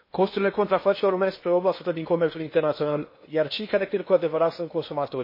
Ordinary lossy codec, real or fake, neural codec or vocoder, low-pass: MP3, 24 kbps; fake; codec, 16 kHz in and 24 kHz out, 0.8 kbps, FocalCodec, streaming, 65536 codes; 5.4 kHz